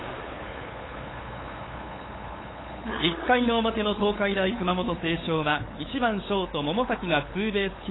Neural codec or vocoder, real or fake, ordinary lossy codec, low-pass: codec, 16 kHz, 8 kbps, FunCodec, trained on LibriTTS, 25 frames a second; fake; AAC, 16 kbps; 7.2 kHz